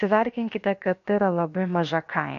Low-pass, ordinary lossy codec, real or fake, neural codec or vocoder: 7.2 kHz; MP3, 48 kbps; fake; codec, 16 kHz, about 1 kbps, DyCAST, with the encoder's durations